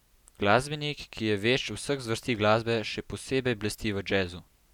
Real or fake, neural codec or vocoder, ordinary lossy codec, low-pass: real; none; none; 19.8 kHz